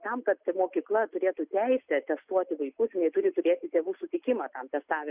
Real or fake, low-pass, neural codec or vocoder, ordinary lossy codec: real; 3.6 kHz; none; Opus, 64 kbps